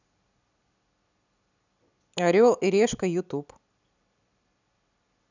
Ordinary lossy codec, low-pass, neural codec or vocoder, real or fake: none; 7.2 kHz; none; real